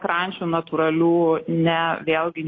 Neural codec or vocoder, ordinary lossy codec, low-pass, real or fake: none; AAC, 32 kbps; 7.2 kHz; real